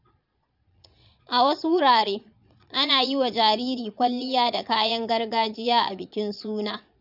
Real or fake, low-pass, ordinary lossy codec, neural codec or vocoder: fake; 5.4 kHz; none; vocoder, 22.05 kHz, 80 mel bands, Vocos